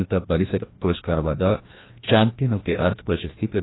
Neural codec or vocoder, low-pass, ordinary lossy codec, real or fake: codec, 16 kHz, 1 kbps, FreqCodec, larger model; 7.2 kHz; AAC, 16 kbps; fake